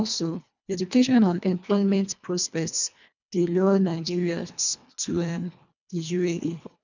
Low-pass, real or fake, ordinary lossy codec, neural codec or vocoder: 7.2 kHz; fake; none; codec, 24 kHz, 1.5 kbps, HILCodec